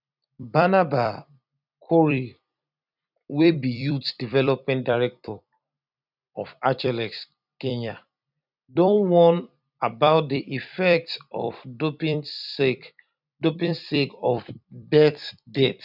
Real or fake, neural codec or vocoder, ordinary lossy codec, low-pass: fake; vocoder, 44.1 kHz, 128 mel bands every 256 samples, BigVGAN v2; none; 5.4 kHz